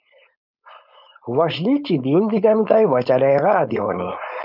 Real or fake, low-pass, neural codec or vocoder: fake; 5.4 kHz; codec, 16 kHz, 4.8 kbps, FACodec